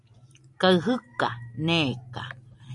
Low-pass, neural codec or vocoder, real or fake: 10.8 kHz; none; real